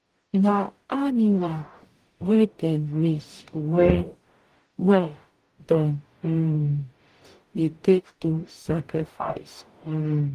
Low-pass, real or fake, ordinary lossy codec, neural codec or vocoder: 14.4 kHz; fake; Opus, 24 kbps; codec, 44.1 kHz, 0.9 kbps, DAC